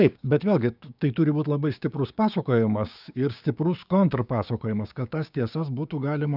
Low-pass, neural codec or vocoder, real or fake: 5.4 kHz; codec, 16 kHz, 6 kbps, DAC; fake